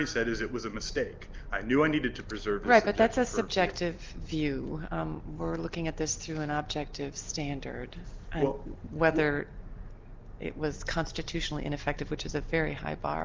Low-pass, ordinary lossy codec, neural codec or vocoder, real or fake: 7.2 kHz; Opus, 32 kbps; none; real